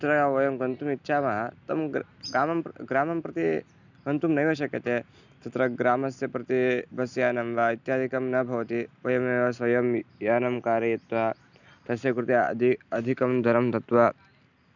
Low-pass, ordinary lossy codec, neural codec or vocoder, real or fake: 7.2 kHz; none; none; real